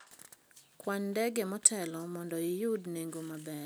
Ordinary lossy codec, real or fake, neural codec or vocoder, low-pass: none; real; none; none